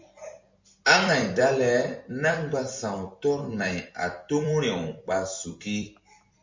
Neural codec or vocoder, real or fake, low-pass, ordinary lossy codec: vocoder, 44.1 kHz, 128 mel bands every 256 samples, BigVGAN v2; fake; 7.2 kHz; MP3, 48 kbps